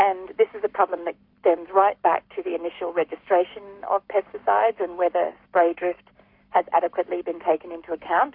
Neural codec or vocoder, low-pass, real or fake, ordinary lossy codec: codec, 44.1 kHz, 7.8 kbps, Pupu-Codec; 5.4 kHz; fake; MP3, 48 kbps